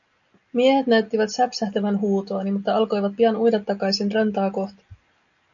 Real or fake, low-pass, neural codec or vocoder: real; 7.2 kHz; none